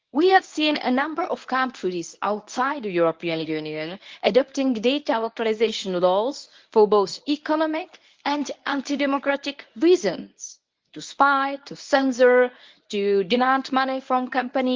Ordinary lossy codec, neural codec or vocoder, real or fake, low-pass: Opus, 32 kbps; codec, 24 kHz, 0.9 kbps, WavTokenizer, medium speech release version 1; fake; 7.2 kHz